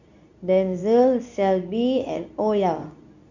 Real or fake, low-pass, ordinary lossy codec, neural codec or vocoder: fake; 7.2 kHz; none; codec, 24 kHz, 0.9 kbps, WavTokenizer, medium speech release version 2